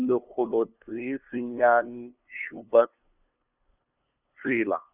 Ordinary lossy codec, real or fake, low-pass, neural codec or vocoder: none; fake; 3.6 kHz; codec, 16 kHz, 2 kbps, FunCodec, trained on LibriTTS, 25 frames a second